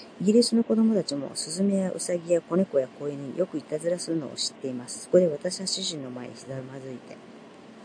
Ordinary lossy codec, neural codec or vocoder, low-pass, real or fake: AAC, 64 kbps; none; 9.9 kHz; real